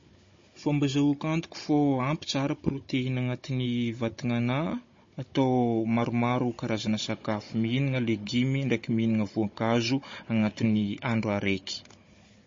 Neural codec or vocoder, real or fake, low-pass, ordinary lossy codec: codec, 16 kHz, 16 kbps, FunCodec, trained on Chinese and English, 50 frames a second; fake; 7.2 kHz; MP3, 32 kbps